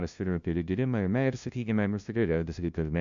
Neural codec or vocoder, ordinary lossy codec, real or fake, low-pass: codec, 16 kHz, 0.5 kbps, FunCodec, trained on LibriTTS, 25 frames a second; MP3, 64 kbps; fake; 7.2 kHz